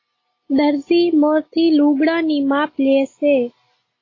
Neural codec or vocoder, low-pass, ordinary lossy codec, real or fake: none; 7.2 kHz; AAC, 32 kbps; real